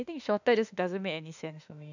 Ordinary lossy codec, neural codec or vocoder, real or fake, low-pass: none; autoencoder, 48 kHz, 32 numbers a frame, DAC-VAE, trained on Japanese speech; fake; 7.2 kHz